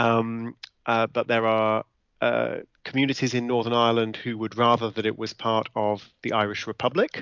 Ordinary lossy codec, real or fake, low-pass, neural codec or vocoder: MP3, 64 kbps; real; 7.2 kHz; none